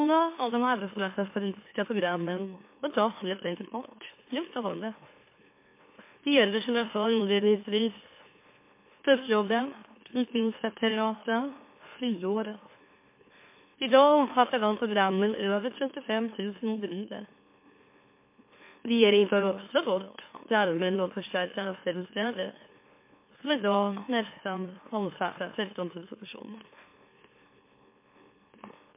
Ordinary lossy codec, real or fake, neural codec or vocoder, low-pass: MP3, 24 kbps; fake; autoencoder, 44.1 kHz, a latent of 192 numbers a frame, MeloTTS; 3.6 kHz